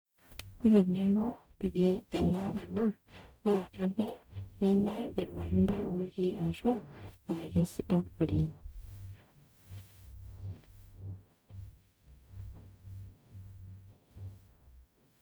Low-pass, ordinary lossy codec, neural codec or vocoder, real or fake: none; none; codec, 44.1 kHz, 0.9 kbps, DAC; fake